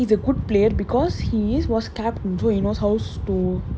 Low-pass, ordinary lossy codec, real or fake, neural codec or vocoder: none; none; real; none